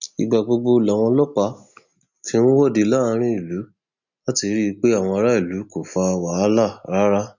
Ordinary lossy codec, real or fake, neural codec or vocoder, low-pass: none; real; none; 7.2 kHz